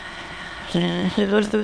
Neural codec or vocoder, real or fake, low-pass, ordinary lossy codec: autoencoder, 22.05 kHz, a latent of 192 numbers a frame, VITS, trained on many speakers; fake; none; none